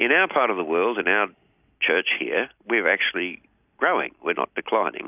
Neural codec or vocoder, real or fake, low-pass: none; real; 3.6 kHz